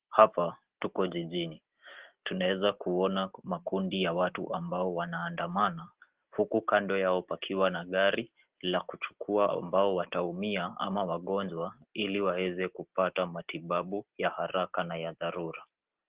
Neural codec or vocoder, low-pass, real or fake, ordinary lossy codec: none; 3.6 kHz; real; Opus, 16 kbps